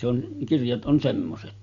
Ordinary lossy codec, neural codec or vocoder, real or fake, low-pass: none; none; real; 7.2 kHz